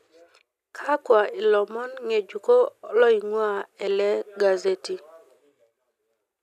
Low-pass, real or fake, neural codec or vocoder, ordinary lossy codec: 14.4 kHz; real; none; none